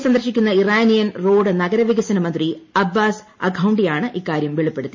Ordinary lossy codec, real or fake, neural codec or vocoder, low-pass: MP3, 32 kbps; real; none; 7.2 kHz